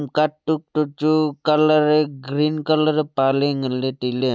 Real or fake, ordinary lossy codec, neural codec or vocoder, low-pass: real; none; none; none